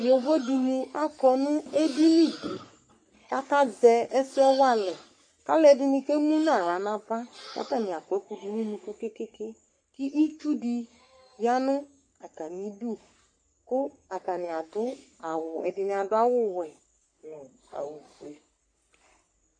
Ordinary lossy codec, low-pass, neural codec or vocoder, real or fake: MP3, 48 kbps; 9.9 kHz; codec, 44.1 kHz, 3.4 kbps, Pupu-Codec; fake